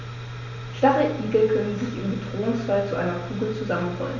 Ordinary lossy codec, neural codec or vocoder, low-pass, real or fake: none; none; 7.2 kHz; real